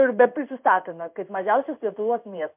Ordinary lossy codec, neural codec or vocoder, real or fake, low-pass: MP3, 32 kbps; codec, 16 kHz in and 24 kHz out, 1 kbps, XY-Tokenizer; fake; 3.6 kHz